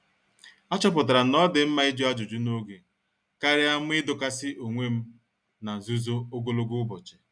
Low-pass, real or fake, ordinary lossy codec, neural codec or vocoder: 9.9 kHz; real; none; none